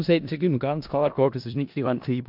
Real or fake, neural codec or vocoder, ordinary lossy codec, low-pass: fake; codec, 16 kHz in and 24 kHz out, 0.4 kbps, LongCat-Audio-Codec, four codebook decoder; MP3, 48 kbps; 5.4 kHz